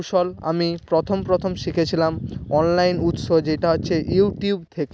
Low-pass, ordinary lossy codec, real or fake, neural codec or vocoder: none; none; real; none